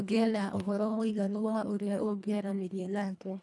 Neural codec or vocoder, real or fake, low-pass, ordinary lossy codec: codec, 24 kHz, 1.5 kbps, HILCodec; fake; none; none